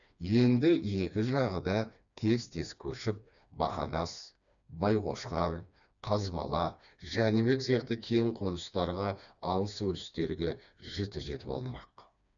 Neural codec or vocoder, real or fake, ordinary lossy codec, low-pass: codec, 16 kHz, 2 kbps, FreqCodec, smaller model; fake; none; 7.2 kHz